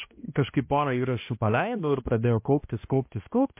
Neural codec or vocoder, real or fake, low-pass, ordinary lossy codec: codec, 16 kHz, 1 kbps, X-Codec, HuBERT features, trained on balanced general audio; fake; 3.6 kHz; MP3, 24 kbps